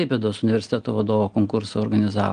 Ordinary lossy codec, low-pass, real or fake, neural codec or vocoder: Opus, 24 kbps; 9.9 kHz; real; none